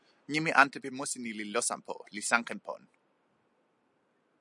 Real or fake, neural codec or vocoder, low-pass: real; none; 10.8 kHz